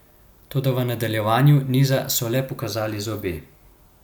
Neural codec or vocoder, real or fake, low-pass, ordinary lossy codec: none; real; 19.8 kHz; none